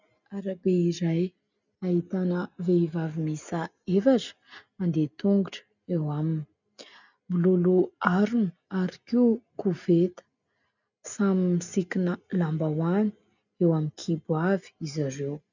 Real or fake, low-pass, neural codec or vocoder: real; 7.2 kHz; none